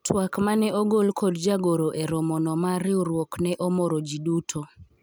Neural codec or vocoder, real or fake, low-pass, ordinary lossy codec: none; real; none; none